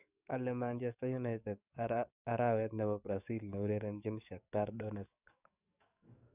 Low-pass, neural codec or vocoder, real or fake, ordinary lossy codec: 3.6 kHz; codec, 44.1 kHz, 7.8 kbps, DAC; fake; none